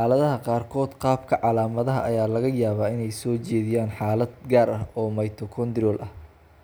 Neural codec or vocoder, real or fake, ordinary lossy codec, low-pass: none; real; none; none